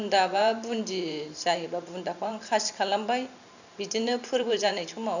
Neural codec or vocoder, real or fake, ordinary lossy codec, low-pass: vocoder, 44.1 kHz, 128 mel bands every 512 samples, BigVGAN v2; fake; none; 7.2 kHz